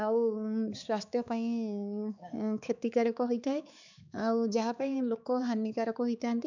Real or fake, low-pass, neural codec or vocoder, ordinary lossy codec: fake; 7.2 kHz; codec, 16 kHz, 4 kbps, X-Codec, HuBERT features, trained on balanced general audio; none